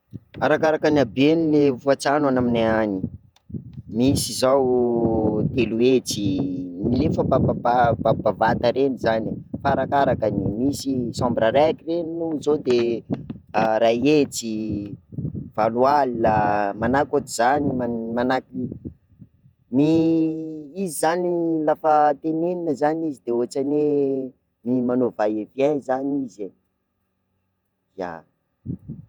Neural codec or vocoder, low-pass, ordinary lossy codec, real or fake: vocoder, 48 kHz, 128 mel bands, Vocos; 19.8 kHz; none; fake